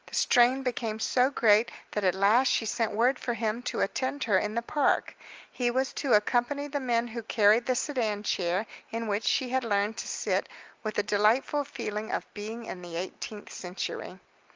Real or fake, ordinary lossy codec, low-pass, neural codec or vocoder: real; Opus, 24 kbps; 7.2 kHz; none